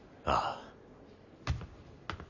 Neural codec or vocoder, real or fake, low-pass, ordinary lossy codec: none; real; 7.2 kHz; none